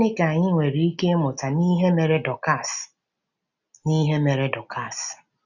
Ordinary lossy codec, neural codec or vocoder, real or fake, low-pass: none; none; real; 7.2 kHz